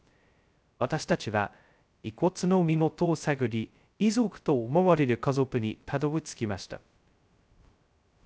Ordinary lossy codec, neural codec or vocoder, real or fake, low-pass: none; codec, 16 kHz, 0.2 kbps, FocalCodec; fake; none